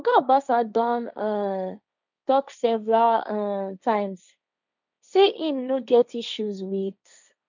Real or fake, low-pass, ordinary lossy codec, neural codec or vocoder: fake; 7.2 kHz; none; codec, 16 kHz, 1.1 kbps, Voila-Tokenizer